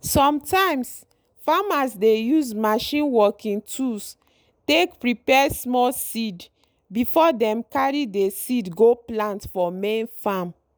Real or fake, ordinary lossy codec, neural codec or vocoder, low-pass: real; none; none; none